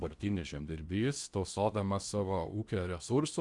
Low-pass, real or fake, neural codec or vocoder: 10.8 kHz; fake; codec, 16 kHz in and 24 kHz out, 0.8 kbps, FocalCodec, streaming, 65536 codes